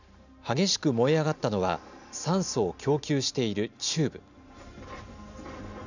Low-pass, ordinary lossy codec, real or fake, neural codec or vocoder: 7.2 kHz; none; real; none